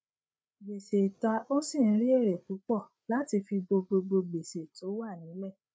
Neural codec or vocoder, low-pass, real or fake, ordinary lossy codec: codec, 16 kHz, 8 kbps, FreqCodec, larger model; none; fake; none